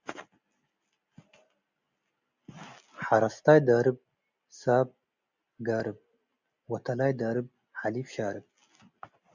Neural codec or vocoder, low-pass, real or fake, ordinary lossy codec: none; 7.2 kHz; real; Opus, 64 kbps